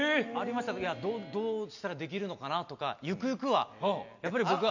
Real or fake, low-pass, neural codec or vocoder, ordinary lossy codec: real; 7.2 kHz; none; none